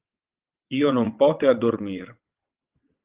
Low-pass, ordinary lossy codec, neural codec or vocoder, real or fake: 3.6 kHz; Opus, 32 kbps; vocoder, 22.05 kHz, 80 mel bands, WaveNeXt; fake